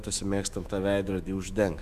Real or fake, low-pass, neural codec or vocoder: fake; 14.4 kHz; autoencoder, 48 kHz, 128 numbers a frame, DAC-VAE, trained on Japanese speech